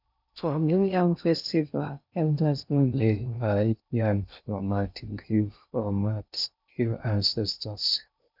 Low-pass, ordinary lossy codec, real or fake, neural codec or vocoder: 5.4 kHz; none; fake; codec, 16 kHz in and 24 kHz out, 0.6 kbps, FocalCodec, streaming, 2048 codes